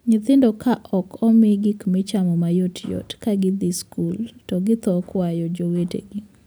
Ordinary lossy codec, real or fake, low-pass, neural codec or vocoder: none; real; none; none